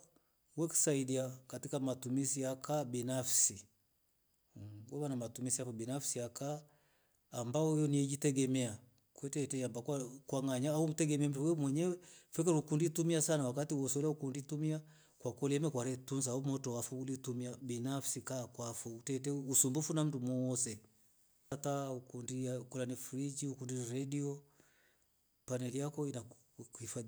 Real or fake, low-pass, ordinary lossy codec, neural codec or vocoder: real; none; none; none